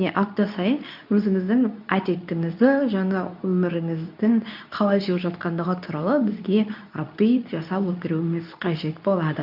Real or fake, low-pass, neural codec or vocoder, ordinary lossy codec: fake; 5.4 kHz; codec, 24 kHz, 0.9 kbps, WavTokenizer, medium speech release version 2; none